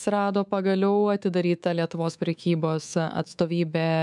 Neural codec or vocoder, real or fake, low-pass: codec, 24 kHz, 3.1 kbps, DualCodec; fake; 10.8 kHz